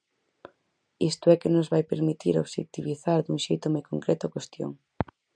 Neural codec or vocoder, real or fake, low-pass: none; real; 9.9 kHz